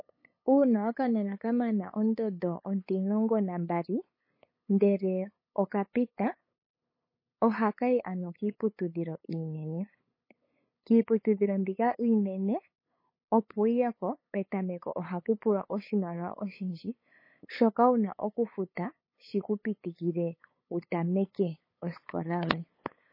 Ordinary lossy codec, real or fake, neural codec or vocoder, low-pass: MP3, 24 kbps; fake; codec, 16 kHz, 8 kbps, FunCodec, trained on LibriTTS, 25 frames a second; 5.4 kHz